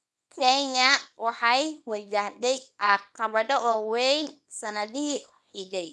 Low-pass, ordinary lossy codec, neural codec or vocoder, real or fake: none; none; codec, 24 kHz, 0.9 kbps, WavTokenizer, small release; fake